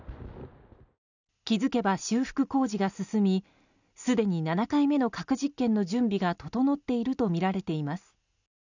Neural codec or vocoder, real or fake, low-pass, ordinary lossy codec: none; real; 7.2 kHz; none